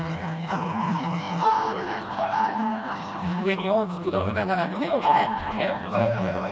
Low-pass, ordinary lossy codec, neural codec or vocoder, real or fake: none; none; codec, 16 kHz, 1 kbps, FreqCodec, smaller model; fake